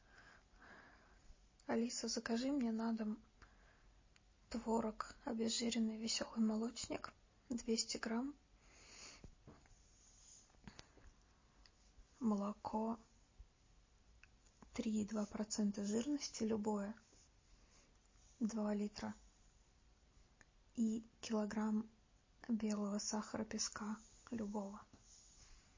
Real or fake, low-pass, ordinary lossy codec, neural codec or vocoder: real; 7.2 kHz; MP3, 32 kbps; none